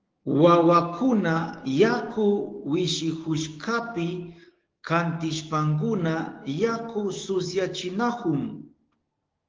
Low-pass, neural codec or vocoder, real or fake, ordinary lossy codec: 7.2 kHz; codec, 16 kHz, 6 kbps, DAC; fake; Opus, 16 kbps